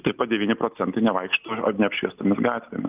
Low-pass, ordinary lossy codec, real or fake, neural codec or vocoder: 3.6 kHz; Opus, 32 kbps; real; none